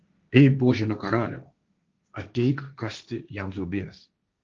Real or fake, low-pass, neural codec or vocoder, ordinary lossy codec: fake; 7.2 kHz; codec, 16 kHz, 1.1 kbps, Voila-Tokenizer; Opus, 24 kbps